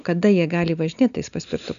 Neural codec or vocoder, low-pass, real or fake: none; 7.2 kHz; real